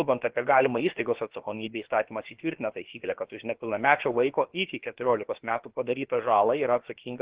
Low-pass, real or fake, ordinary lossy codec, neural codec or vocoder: 3.6 kHz; fake; Opus, 16 kbps; codec, 16 kHz, about 1 kbps, DyCAST, with the encoder's durations